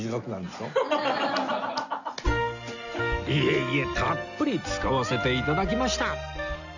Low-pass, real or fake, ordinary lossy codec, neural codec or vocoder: 7.2 kHz; real; none; none